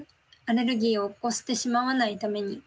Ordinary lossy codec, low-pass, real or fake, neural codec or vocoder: none; none; real; none